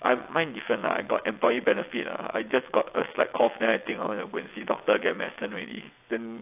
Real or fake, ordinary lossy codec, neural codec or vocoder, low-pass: fake; AAC, 32 kbps; vocoder, 22.05 kHz, 80 mel bands, WaveNeXt; 3.6 kHz